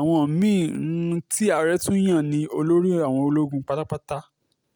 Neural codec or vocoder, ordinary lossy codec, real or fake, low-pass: none; none; real; none